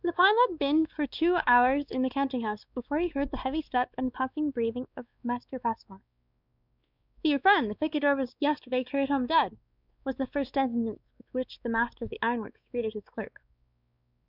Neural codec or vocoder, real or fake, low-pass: codec, 16 kHz, 2 kbps, X-Codec, WavLM features, trained on Multilingual LibriSpeech; fake; 5.4 kHz